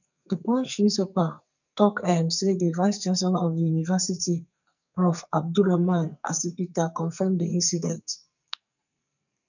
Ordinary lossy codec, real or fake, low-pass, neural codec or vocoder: none; fake; 7.2 kHz; codec, 44.1 kHz, 2.6 kbps, SNAC